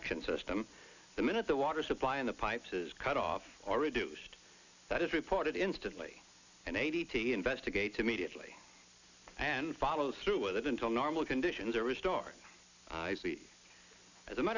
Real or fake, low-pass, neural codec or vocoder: real; 7.2 kHz; none